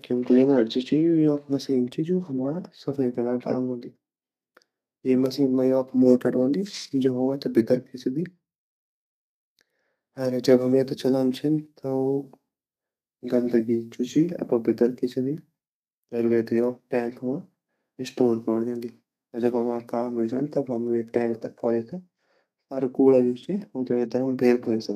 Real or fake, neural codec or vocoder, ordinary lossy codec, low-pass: fake; codec, 32 kHz, 1.9 kbps, SNAC; none; 14.4 kHz